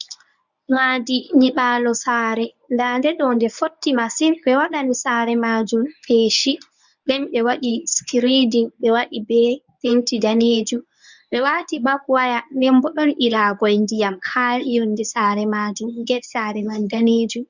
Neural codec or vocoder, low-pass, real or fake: codec, 24 kHz, 0.9 kbps, WavTokenizer, medium speech release version 1; 7.2 kHz; fake